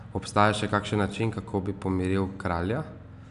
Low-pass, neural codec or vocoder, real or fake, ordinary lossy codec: 10.8 kHz; none; real; none